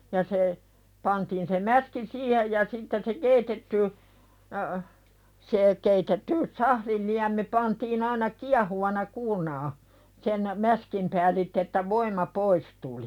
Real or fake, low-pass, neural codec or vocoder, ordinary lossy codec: real; 19.8 kHz; none; none